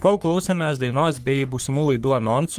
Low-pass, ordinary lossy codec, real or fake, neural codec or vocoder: 14.4 kHz; Opus, 32 kbps; fake; codec, 44.1 kHz, 3.4 kbps, Pupu-Codec